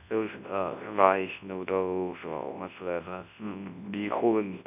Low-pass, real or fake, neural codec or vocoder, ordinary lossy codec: 3.6 kHz; fake; codec, 24 kHz, 0.9 kbps, WavTokenizer, large speech release; none